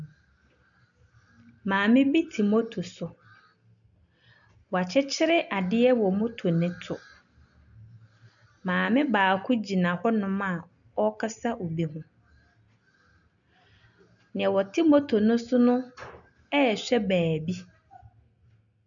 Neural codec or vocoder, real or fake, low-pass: none; real; 7.2 kHz